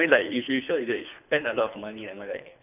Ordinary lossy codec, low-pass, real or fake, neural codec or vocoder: none; 3.6 kHz; fake; codec, 24 kHz, 3 kbps, HILCodec